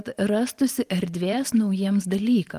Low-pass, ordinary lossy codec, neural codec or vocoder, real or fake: 14.4 kHz; Opus, 24 kbps; vocoder, 44.1 kHz, 128 mel bands every 512 samples, BigVGAN v2; fake